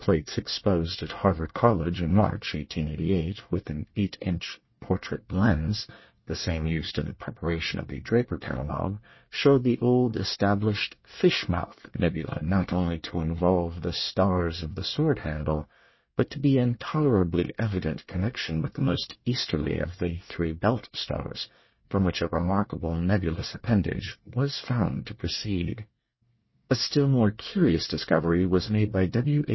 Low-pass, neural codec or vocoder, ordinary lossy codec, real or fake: 7.2 kHz; codec, 24 kHz, 1 kbps, SNAC; MP3, 24 kbps; fake